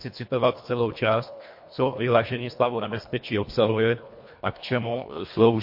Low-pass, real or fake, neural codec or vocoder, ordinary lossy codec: 5.4 kHz; fake; codec, 24 kHz, 1.5 kbps, HILCodec; MP3, 32 kbps